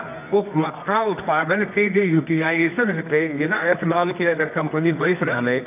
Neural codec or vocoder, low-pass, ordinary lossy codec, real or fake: codec, 24 kHz, 0.9 kbps, WavTokenizer, medium music audio release; 3.6 kHz; none; fake